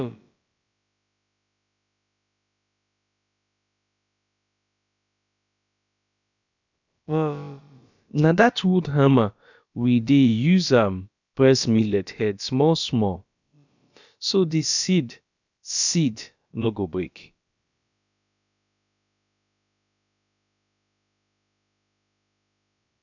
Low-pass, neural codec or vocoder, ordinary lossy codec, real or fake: 7.2 kHz; codec, 16 kHz, about 1 kbps, DyCAST, with the encoder's durations; none; fake